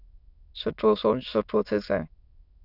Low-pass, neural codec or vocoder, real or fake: 5.4 kHz; autoencoder, 22.05 kHz, a latent of 192 numbers a frame, VITS, trained on many speakers; fake